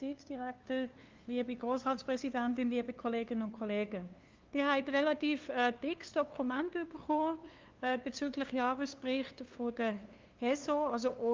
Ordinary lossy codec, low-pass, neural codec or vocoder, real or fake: Opus, 24 kbps; 7.2 kHz; codec, 16 kHz, 2 kbps, FunCodec, trained on LibriTTS, 25 frames a second; fake